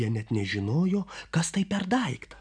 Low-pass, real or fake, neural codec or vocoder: 9.9 kHz; real; none